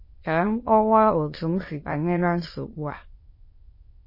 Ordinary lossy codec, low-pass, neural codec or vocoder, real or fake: MP3, 24 kbps; 5.4 kHz; autoencoder, 22.05 kHz, a latent of 192 numbers a frame, VITS, trained on many speakers; fake